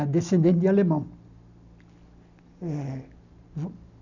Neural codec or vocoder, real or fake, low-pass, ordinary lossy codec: none; real; 7.2 kHz; none